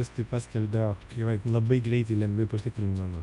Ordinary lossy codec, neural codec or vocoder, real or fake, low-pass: Opus, 64 kbps; codec, 24 kHz, 0.9 kbps, WavTokenizer, large speech release; fake; 10.8 kHz